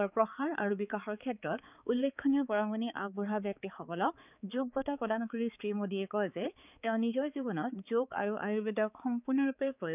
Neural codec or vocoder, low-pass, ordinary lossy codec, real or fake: codec, 16 kHz, 4 kbps, X-Codec, HuBERT features, trained on balanced general audio; 3.6 kHz; none; fake